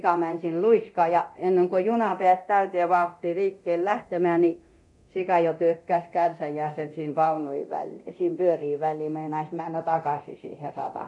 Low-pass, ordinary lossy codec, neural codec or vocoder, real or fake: 10.8 kHz; none; codec, 24 kHz, 0.9 kbps, DualCodec; fake